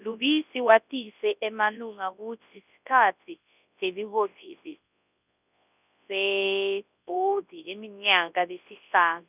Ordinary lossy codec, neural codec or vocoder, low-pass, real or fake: none; codec, 24 kHz, 0.9 kbps, WavTokenizer, large speech release; 3.6 kHz; fake